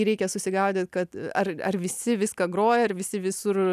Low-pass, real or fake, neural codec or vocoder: 14.4 kHz; real; none